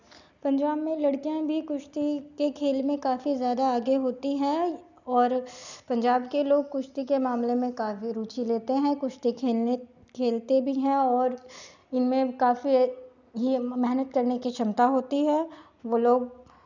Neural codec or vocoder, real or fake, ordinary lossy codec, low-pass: none; real; none; 7.2 kHz